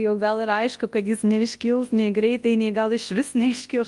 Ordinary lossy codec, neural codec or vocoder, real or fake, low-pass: Opus, 32 kbps; codec, 24 kHz, 0.9 kbps, WavTokenizer, large speech release; fake; 10.8 kHz